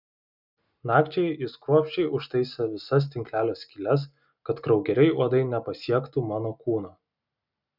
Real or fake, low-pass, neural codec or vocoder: real; 5.4 kHz; none